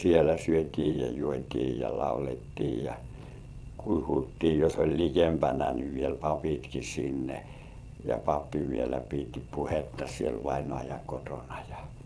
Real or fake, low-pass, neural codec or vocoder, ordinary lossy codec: fake; none; vocoder, 22.05 kHz, 80 mel bands, Vocos; none